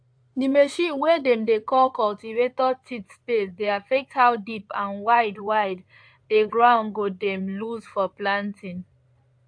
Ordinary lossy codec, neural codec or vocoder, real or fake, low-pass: AAC, 64 kbps; codec, 16 kHz in and 24 kHz out, 2.2 kbps, FireRedTTS-2 codec; fake; 9.9 kHz